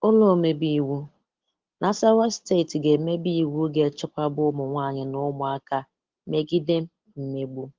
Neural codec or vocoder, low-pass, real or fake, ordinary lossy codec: none; 7.2 kHz; real; Opus, 16 kbps